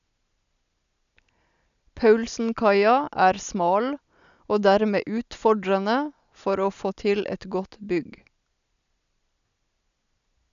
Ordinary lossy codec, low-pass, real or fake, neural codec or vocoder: none; 7.2 kHz; real; none